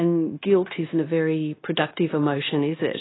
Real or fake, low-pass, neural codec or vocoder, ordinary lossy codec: fake; 7.2 kHz; codec, 16 kHz, 0.9 kbps, LongCat-Audio-Codec; AAC, 16 kbps